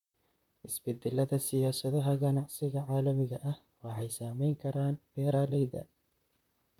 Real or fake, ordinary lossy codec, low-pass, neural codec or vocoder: fake; none; 19.8 kHz; vocoder, 44.1 kHz, 128 mel bands, Pupu-Vocoder